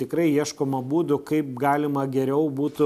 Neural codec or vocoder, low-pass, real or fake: none; 14.4 kHz; real